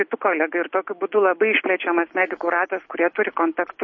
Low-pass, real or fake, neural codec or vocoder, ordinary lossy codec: 7.2 kHz; real; none; MP3, 32 kbps